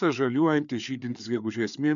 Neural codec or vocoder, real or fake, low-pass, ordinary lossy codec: codec, 16 kHz, 4 kbps, FreqCodec, larger model; fake; 7.2 kHz; MP3, 96 kbps